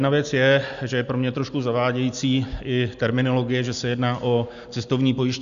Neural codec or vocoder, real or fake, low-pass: none; real; 7.2 kHz